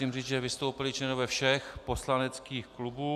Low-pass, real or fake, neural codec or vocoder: 14.4 kHz; real; none